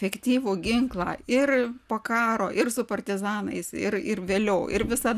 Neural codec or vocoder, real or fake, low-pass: none; real; 14.4 kHz